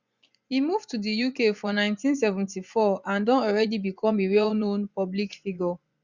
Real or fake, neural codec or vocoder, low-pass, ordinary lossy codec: fake; vocoder, 24 kHz, 100 mel bands, Vocos; 7.2 kHz; Opus, 64 kbps